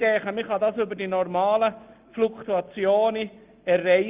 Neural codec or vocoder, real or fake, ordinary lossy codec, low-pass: none; real; Opus, 24 kbps; 3.6 kHz